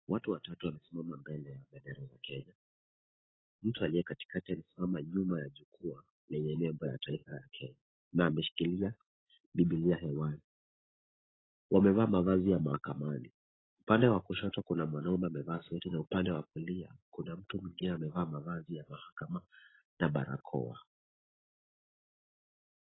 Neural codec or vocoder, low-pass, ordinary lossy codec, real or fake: none; 7.2 kHz; AAC, 16 kbps; real